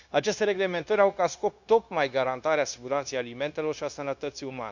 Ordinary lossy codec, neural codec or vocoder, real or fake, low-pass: none; codec, 16 kHz, 0.9 kbps, LongCat-Audio-Codec; fake; 7.2 kHz